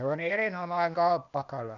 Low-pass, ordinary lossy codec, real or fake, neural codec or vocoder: 7.2 kHz; none; fake; codec, 16 kHz, 0.8 kbps, ZipCodec